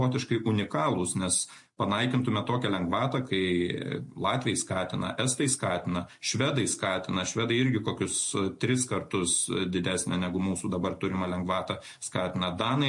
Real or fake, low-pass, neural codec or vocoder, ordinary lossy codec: real; 10.8 kHz; none; MP3, 48 kbps